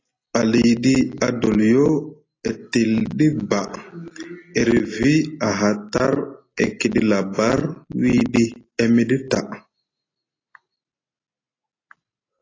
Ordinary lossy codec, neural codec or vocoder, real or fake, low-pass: AAC, 32 kbps; none; real; 7.2 kHz